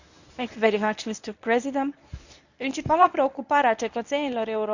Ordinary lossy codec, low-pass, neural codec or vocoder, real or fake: none; 7.2 kHz; codec, 24 kHz, 0.9 kbps, WavTokenizer, medium speech release version 1; fake